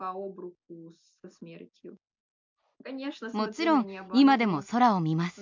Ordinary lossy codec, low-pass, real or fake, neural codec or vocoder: MP3, 64 kbps; 7.2 kHz; real; none